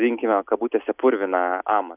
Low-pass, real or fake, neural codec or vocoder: 3.6 kHz; real; none